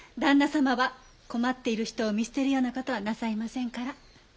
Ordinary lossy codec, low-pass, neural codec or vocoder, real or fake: none; none; none; real